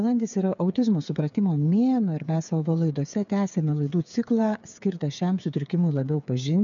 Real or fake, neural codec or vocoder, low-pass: fake; codec, 16 kHz, 8 kbps, FreqCodec, smaller model; 7.2 kHz